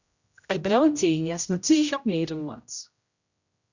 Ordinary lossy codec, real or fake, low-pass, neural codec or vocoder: Opus, 64 kbps; fake; 7.2 kHz; codec, 16 kHz, 0.5 kbps, X-Codec, HuBERT features, trained on general audio